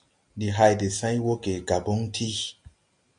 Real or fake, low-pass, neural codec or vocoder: real; 9.9 kHz; none